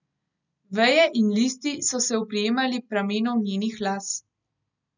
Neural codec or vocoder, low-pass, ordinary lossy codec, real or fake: none; 7.2 kHz; none; real